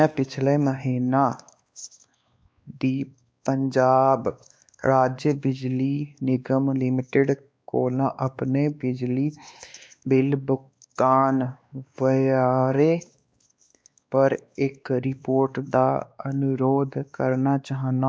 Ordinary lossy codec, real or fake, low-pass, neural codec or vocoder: none; fake; none; codec, 16 kHz, 2 kbps, X-Codec, WavLM features, trained on Multilingual LibriSpeech